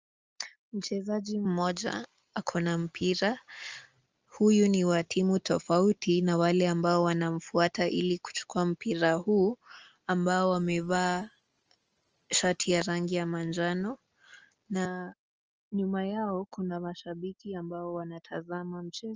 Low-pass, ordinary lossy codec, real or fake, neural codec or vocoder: 7.2 kHz; Opus, 24 kbps; real; none